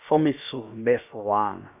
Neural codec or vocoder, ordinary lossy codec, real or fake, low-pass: codec, 16 kHz, about 1 kbps, DyCAST, with the encoder's durations; none; fake; 3.6 kHz